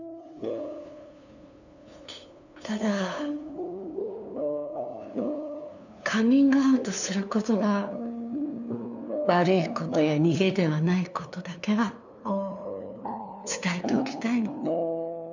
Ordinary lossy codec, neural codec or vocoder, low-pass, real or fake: none; codec, 16 kHz, 2 kbps, FunCodec, trained on LibriTTS, 25 frames a second; 7.2 kHz; fake